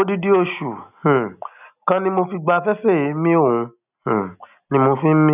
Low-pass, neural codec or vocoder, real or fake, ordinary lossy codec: 3.6 kHz; none; real; none